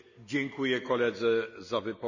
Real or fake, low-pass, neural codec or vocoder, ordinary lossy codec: real; 7.2 kHz; none; none